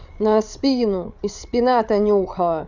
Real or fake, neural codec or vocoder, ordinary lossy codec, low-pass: fake; codec, 16 kHz, 8 kbps, FreqCodec, larger model; none; 7.2 kHz